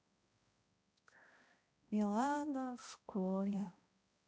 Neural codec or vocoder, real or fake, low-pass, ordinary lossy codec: codec, 16 kHz, 0.5 kbps, X-Codec, HuBERT features, trained on balanced general audio; fake; none; none